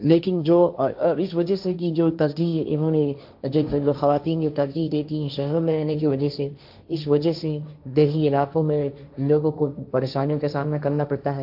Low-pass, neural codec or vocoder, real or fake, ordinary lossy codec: 5.4 kHz; codec, 16 kHz, 1.1 kbps, Voila-Tokenizer; fake; AAC, 48 kbps